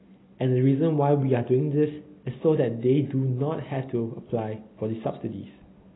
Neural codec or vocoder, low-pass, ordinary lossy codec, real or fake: none; 7.2 kHz; AAC, 16 kbps; real